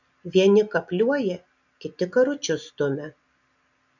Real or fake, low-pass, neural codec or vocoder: real; 7.2 kHz; none